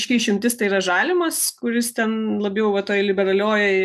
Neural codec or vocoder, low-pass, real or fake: none; 14.4 kHz; real